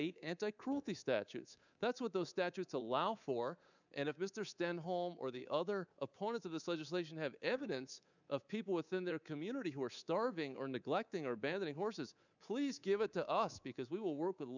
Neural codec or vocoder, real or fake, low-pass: codec, 24 kHz, 3.1 kbps, DualCodec; fake; 7.2 kHz